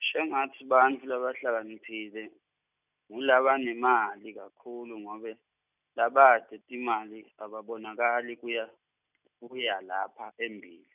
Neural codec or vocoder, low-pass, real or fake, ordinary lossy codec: none; 3.6 kHz; real; none